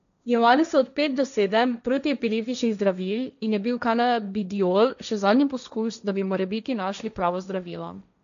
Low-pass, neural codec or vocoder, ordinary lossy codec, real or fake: 7.2 kHz; codec, 16 kHz, 1.1 kbps, Voila-Tokenizer; none; fake